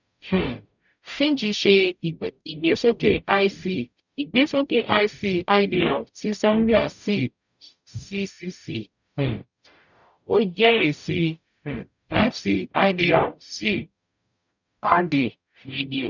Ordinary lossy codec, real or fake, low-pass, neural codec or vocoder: none; fake; 7.2 kHz; codec, 44.1 kHz, 0.9 kbps, DAC